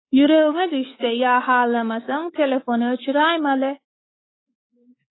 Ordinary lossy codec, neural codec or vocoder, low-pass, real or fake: AAC, 16 kbps; codec, 24 kHz, 3.1 kbps, DualCodec; 7.2 kHz; fake